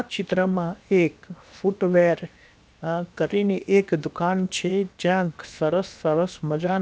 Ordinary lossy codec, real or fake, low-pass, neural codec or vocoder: none; fake; none; codec, 16 kHz, 0.7 kbps, FocalCodec